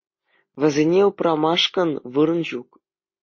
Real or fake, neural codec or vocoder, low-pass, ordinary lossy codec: real; none; 7.2 kHz; MP3, 32 kbps